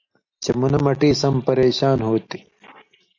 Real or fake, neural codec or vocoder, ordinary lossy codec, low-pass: real; none; AAC, 48 kbps; 7.2 kHz